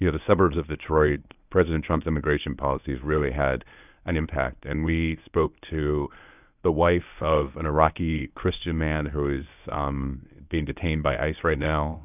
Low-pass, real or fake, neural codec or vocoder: 3.6 kHz; fake; codec, 24 kHz, 0.9 kbps, WavTokenizer, medium speech release version 1